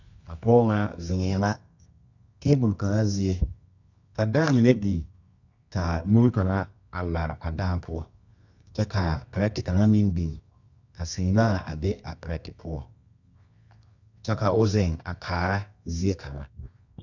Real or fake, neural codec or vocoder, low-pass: fake; codec, 24 kHz, 0.9 kbps, WavTokenizer, medium music audio release; 7.2 kHz